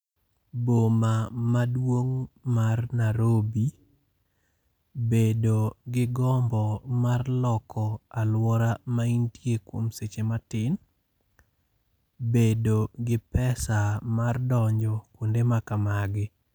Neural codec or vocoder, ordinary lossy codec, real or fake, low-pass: none; none; real; none